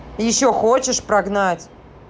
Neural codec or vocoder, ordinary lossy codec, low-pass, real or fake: none; none; none; real